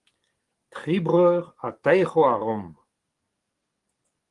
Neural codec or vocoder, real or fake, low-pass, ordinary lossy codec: codec, 44.1 kHz, 7.8 kbps, DAC; fake; 10.8 kHz; Opus, 24 kbps